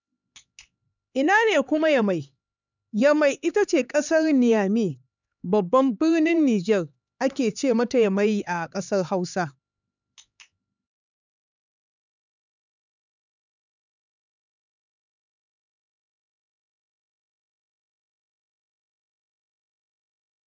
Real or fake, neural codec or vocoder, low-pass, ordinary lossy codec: fake; codec, 16 kHz, 4 kbps, X-Codec, HuBERT features, trained on LibriSpeech; 7.2 kHz; none